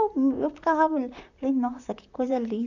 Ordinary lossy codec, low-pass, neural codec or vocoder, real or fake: none; 7.2 kHz; vocoder, 44.1 kHz, 128 mel bands, Pupu-Vocoder; fake